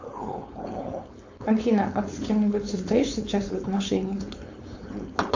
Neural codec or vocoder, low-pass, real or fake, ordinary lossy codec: codec, 16 kHz, 4.8 kbps, FACodec; 7.2 kHz; fake; AAC, 48 kbps